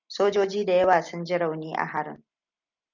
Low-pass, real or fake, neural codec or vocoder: 7.2 kHz; real; none